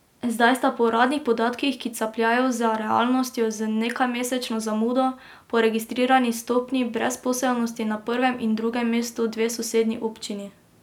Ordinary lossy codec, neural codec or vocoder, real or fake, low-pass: none; none; real; 19.8 kHz